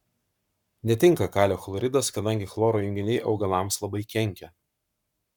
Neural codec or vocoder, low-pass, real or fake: codec, 44.1 kHz, 7.8 kbps, Pupu-Codec; 19.8 kHz; fake